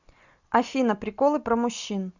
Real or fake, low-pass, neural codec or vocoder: real; 7.2 kHz; none